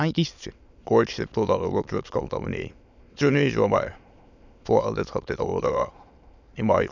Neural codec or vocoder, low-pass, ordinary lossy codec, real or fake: autoencoder, 22.05 kHz, a latent of 192 numbers a frame, VITS, trained on many speakers; 7.2 kHz; none; fake